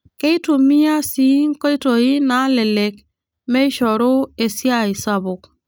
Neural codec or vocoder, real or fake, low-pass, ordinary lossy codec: none; real; none; none